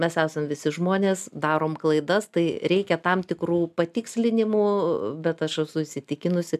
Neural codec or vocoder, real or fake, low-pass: none; real; 14.4 kHz